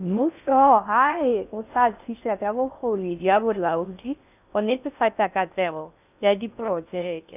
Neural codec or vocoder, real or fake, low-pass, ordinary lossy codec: codec, 16 kHz in and 24 kHz out, 0.6 kbps, FocalCodec, streaming, 4096 codes; fake; 3.6 kHz; none